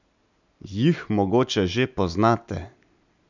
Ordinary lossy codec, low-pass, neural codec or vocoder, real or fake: none; 7.2 kHz; none; real